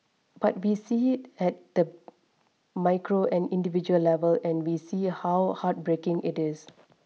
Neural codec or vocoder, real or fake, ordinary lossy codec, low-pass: none; real; none; none